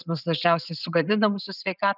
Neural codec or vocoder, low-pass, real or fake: none; 5.4 kHz; real